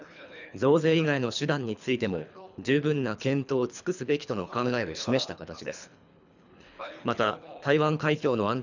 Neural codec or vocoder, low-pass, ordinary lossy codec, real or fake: codec, 24 kHz, 3 kbps, HILCodec; 7.2 kHz; none; fake